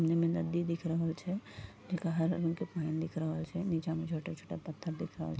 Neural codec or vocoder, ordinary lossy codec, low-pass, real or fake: none; none; none; real